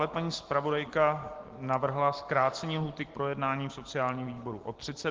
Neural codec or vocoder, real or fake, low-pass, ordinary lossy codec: none; real; 7.2 kHz; Opus, 16 kbps